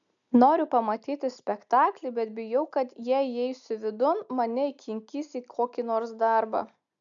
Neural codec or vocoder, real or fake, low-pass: none; real; 7.2 kHz